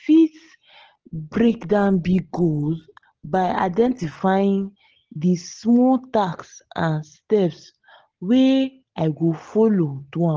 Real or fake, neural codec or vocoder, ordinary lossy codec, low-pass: real; none; Opus, 16 kbps; 7.2 kHz